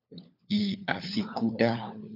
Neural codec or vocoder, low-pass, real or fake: codec, 16 kHz, 4 kbps, FunCodec, trained on LibriTTS, 50 frames a second; 5.4 kHz; fake